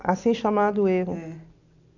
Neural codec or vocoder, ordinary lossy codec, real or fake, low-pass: none; none; real; 7.2 kHz